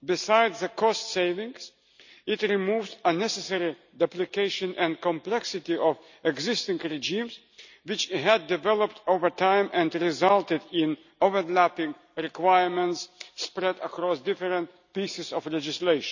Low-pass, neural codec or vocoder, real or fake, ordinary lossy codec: 7.2 kHz; none; real; none